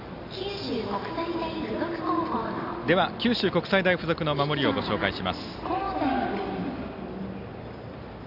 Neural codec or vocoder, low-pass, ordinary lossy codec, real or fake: none; 5.4 kHz; none; real